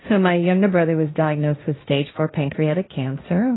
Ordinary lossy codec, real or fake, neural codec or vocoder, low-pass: AAC, 16 kbps; fake; codec, 16 kHz, 1.1 kbps, Voila-Tokenizer; 7.2 kHz